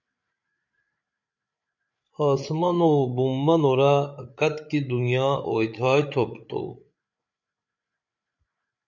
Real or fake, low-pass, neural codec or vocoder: fake; 7.2 kHz; codec, 16 kHz, 8 kbps, FreqCodec, larger model